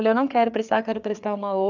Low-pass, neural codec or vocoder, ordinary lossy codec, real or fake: 7.2 kHz; codec, 16 kHz, 4 kbps, FreqCodec, larger model; none; fake